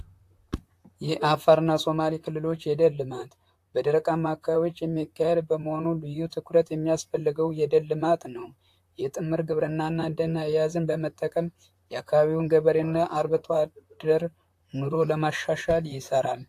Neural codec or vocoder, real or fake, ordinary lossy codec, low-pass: vocoder, 44.1 kHz, 128 mel bands, Pupu-Vocoder; fake; AAC, 64 kbps; 14.4 kHz